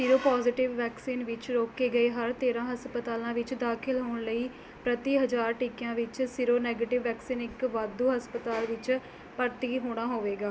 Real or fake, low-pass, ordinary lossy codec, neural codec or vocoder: real; none; none; none